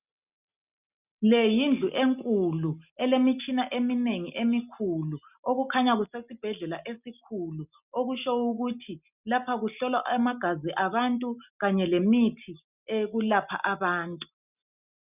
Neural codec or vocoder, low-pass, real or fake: none; 3.6 kHz; real